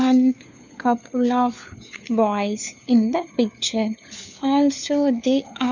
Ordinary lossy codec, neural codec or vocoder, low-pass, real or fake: none; codec, 16 kHz, 4 kbps, FunCodec, trained on LibriTTS, 50 frames a second; 7.2 kHz; fake